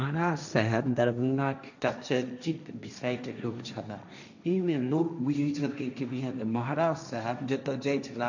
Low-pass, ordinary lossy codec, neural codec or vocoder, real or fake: 7.2 kHz; none; codec, 16 kHz, 1.1 kbps, Voila-Tokenizer; fake